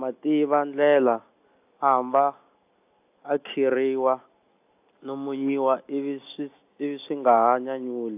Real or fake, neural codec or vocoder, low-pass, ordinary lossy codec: real; none; 3.6 kHz; AAC, 32 kbps